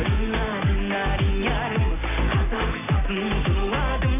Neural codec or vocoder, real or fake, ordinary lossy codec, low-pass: none; real; AAC, 16 kbps; 3.6 kHz